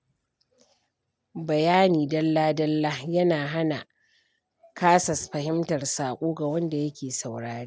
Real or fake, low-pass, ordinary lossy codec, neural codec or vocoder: real; none; none; none